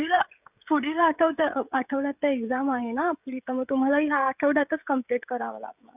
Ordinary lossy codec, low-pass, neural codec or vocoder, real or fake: none; 3.6 kHz; codec, 16 kHz, 16 kbps, FreqCodec, smaller model; fake